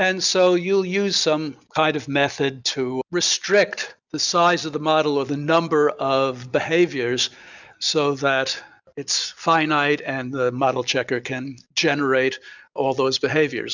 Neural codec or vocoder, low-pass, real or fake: none; 7.2 kHz; real